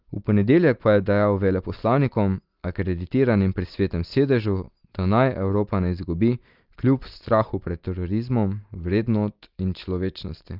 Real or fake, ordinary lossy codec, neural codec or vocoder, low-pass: real; Opus, 32 kbps; none; 5.4 kHz